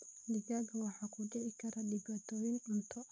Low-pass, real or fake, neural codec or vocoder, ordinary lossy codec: none; real; none; none